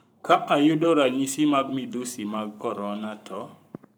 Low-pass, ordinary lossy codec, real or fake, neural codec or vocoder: none; none; fake; codec, 44.1 kHz, 7.8 kbps, Pupu-Codec